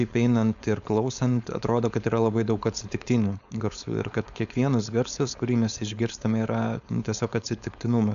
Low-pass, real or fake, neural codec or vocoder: 7.2 kHz; fake; codec, 16 kHz, 4.8 kbps, FACodec